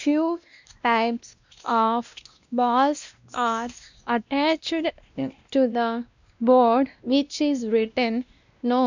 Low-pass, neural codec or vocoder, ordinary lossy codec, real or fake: 7.2 kHz; codec, 16 kHz, 1 kbps, X-Codec, WavLM features, trained on Multilingual LibriSpeech; none; fake